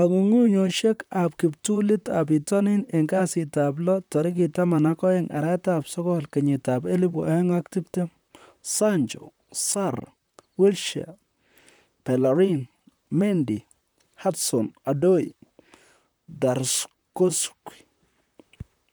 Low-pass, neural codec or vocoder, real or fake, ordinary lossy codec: none; vocoder, 44.1 kHz, 128 mel bands, Pupu-Vocoder; fake; none